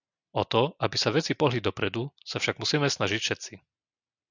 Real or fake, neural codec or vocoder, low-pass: real; none; 7.2 kHz